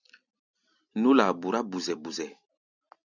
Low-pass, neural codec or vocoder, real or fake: 7.2 kHz; none; real